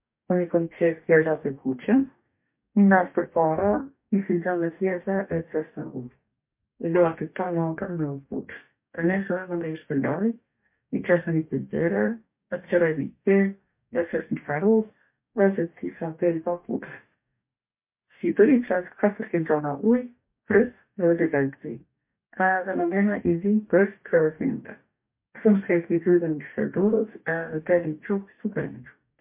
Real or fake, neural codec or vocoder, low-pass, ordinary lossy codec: fake; codec, 44.1 kHz, 2.6 kbps, DAC; 3.6 kHz; MP3, 32 kbps